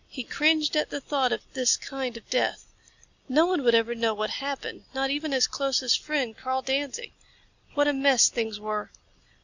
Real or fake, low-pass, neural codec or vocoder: real; 7.2 kHz; none